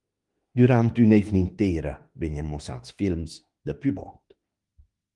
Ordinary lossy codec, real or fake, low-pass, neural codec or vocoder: Opus, 24 kbps; fake; 10.8 kHz; autoencoder, 48 kHz, 32 numbers a frame, DAC-VAE, trained on Japanese speech